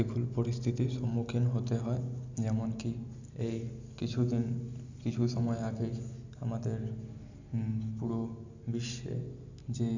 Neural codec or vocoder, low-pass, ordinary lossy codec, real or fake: none; 7.2 kHz; none; real